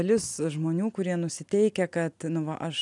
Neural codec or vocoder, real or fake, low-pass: none; real; 10.8 kHz